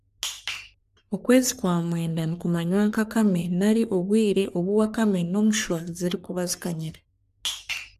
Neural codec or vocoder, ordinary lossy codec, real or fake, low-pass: codec, 44.1 kHz, 3.4 kbps, Pupu-Codec; none; fake; 14.4 kHz